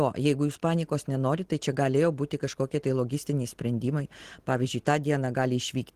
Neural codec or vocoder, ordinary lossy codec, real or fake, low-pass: none; Opus, 16 kbps; real; 14.4 kHz